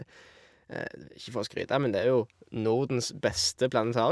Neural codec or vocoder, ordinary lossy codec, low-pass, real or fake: none; none; none; real